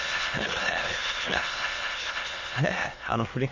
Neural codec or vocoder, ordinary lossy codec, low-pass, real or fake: autoencoder, 22.05 kHz, a latent of 192 numbers a frame, VITS, trained on many speakers; MP3, 32 kbps; 7.2 kHz; fake